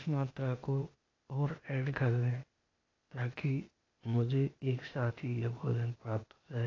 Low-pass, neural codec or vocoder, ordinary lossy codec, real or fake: 7.2 kHz; codec, 16 kHz, 0.8 kbps, ZipCodec; AAC, 32 kbps; fake